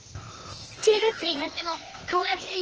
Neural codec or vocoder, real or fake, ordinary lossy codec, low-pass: codec, 16 kHz, 0.8 kbps, ZipCodec; fake; Opus, 16 kbps; 7.2 kHz